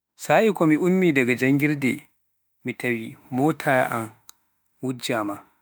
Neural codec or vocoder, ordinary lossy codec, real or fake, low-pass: autoencoder, 48 kHz, 32 numbers a frame, DAC-VAE, trained on Japanese speech; none; fake; none